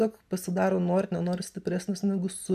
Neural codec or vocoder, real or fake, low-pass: none; real; 14.4 kHz